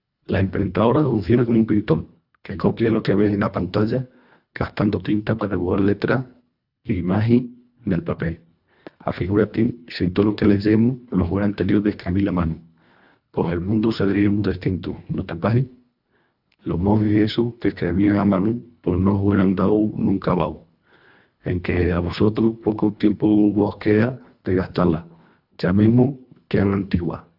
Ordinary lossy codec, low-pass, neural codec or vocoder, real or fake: AAC, 48 kbps; 5.4 kHz; codec, 24 kHz, 1.5 kbps, HILCodec; fake